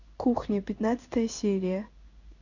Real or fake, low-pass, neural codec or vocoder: fake; 7.2 kHz; codec, 16 kHz in and 24 kHz out, 1 kbps, XY-Tokenizer